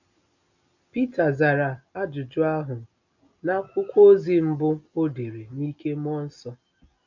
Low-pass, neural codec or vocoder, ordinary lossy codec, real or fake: 7.2 kHz; none; none; real